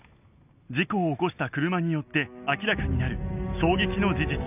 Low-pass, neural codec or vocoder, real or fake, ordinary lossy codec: 3.6 kHz; none; real; none